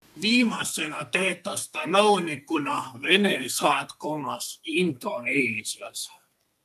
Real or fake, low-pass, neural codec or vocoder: fake; 14.4 kHz; codec, 32 kHz, 1.9 kbps, SNAC